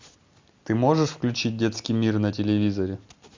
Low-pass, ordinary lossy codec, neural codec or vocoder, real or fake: 7.2 kHz; MP3, 64 kbps; none; real